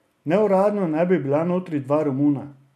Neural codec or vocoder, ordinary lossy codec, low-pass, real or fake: none; MP3, 64 kbps; 14.4 kHz; real